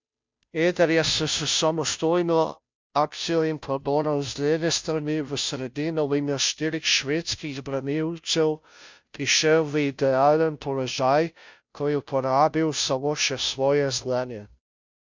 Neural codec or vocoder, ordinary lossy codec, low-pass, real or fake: codec, 16 kHz, 0.5 kbps, FunCodec, trained on Chinese and English, 25 frames a second; MP3, 64 kbps; 7.2 kHz; fake